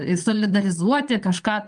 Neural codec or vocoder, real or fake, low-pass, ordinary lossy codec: vocoder, 22.05 kHz, 80 mel bands, Vocos; fake; 9.9 kHz; Opus, 32 kbps